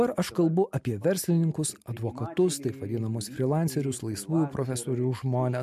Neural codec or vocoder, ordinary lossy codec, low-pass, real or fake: none; MP3, 64 kbps; 14.4 kHz; real